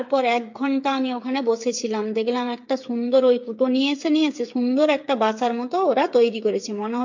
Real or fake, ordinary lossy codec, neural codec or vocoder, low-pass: fake; MP3, 48 kbps; codec, 16 kHz, 8 kbps, FreqCodec, smaller model; 7.2 kHz